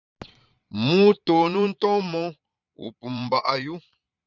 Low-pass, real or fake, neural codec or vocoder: 7.2 kHz; fake; vocoder, 22.05 kHz, 80 mel bands, Vocos